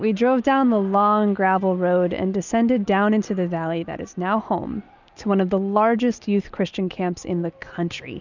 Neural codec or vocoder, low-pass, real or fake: none; 7.2 kHz; real